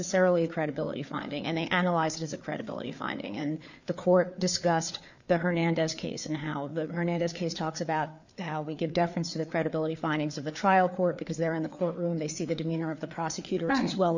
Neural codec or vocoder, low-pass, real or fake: codec, 16 kHz, 4 kbps, FreqCodec, larger model; 7.2 kHz; fake